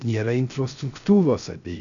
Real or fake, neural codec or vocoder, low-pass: fake; codec, 16 kHz, 0.3 kbps, FocalCodec; 7.2 kHz